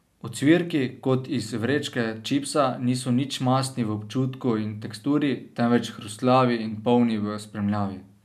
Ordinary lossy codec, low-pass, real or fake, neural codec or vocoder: none; 14.4 kHz; real; none